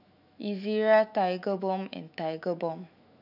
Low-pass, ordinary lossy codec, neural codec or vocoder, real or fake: 5.4 kHz; none; autoencoder, 48 kHz, 128 numbers a frame, DAC-VAE, trained on Japanese speech; fake